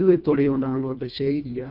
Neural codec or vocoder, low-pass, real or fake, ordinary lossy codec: codec, 24 kHz, 1.5 kbps, HILCodec; 5.4 kHz; fake; none